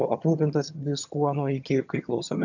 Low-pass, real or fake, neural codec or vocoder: 7.2 kHz; fake; vocoder, 22.05 kHz, 80 mel bands, HiFi-GAN